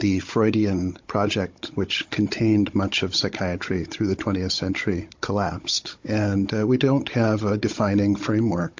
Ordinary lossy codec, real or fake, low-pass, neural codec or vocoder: MP3, 48 kbps; fake; 7.2 kHz; codec, 16 kHz, 16 kbps, FunCodec, trained on Chinese and English, 50 frames a second